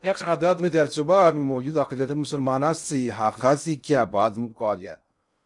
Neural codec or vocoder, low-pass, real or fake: codec, 16 kHz in and 24 kHz out, 0.6 kbps, FocalCodec, streaming, 2048 codes; 10.8 kHz; fake